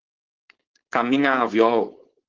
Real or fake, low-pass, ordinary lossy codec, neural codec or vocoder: fake; 7.2 kHz; Opus, 16 kbps; codec, 16 kHz, 4.8 kbps, FACodec